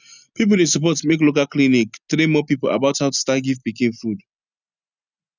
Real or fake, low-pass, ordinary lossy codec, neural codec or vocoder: real; 7.2 kHz; none; none